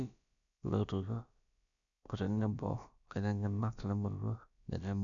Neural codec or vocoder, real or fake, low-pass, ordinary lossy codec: codec, 16 kHz, about 1 kbps, DyCAST, with the encoder's durations; fake; 7.2 kHz; MP3, 96 kbps